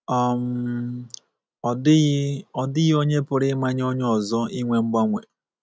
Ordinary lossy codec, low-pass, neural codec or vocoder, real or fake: none; none; none; real